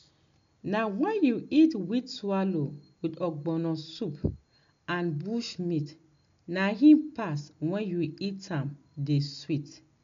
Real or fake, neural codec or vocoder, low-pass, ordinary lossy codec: real; none; 7.2 kHz; MP3, 64 kbps